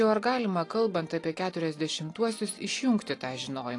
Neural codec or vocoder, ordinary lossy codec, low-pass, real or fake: none; AAC, 48 kbps; 10.8 kHz; real